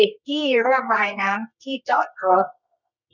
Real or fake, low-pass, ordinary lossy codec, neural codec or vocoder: fake; 7.2 kHz; none; codec, 24 kHz, 0.9 kbps, WavTokenizer, medium music audio release